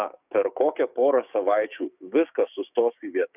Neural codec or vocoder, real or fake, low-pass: codec, 16 kHz, 6 kbps, DAC; fake; 3.6 kHz